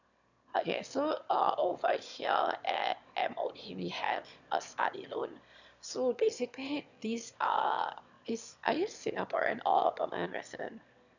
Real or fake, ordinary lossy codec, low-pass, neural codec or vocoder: fake; none; 7.2 kHz; autoencoder, 22.05 kHz, a latent of 192 numbers a frame, VITS, trained on one speaker